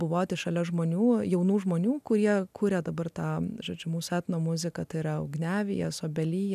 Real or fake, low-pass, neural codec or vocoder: real; 14.4 kHz; none